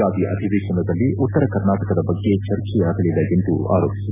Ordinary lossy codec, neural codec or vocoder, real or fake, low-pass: none; none; real; 3.6 kHz